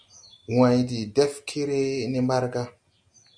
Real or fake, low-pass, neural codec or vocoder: real; 9.9 kHz; none